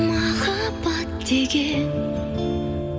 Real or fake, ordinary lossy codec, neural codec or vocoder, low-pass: real; none; none; none